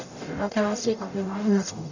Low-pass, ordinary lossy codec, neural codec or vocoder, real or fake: 7.2 kHz; none; codec, 44.1 kHz, 0.9 kbps, DAC; fake